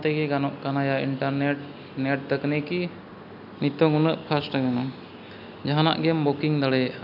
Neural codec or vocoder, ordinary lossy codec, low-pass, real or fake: none; none; 5.4 kHz; real